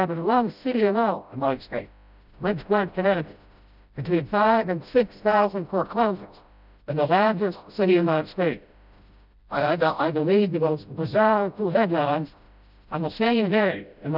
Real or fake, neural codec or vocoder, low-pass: fake; codec, 16 kHz, 0.5 kbps, FreqCodec, smaller model; 5.4 kHz